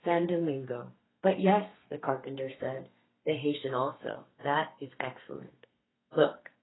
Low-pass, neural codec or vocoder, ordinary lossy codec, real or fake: 7.2 kHz; codec, 32 kHz, 1.9 kbps, SNAC; AAC, 16 kbps; fake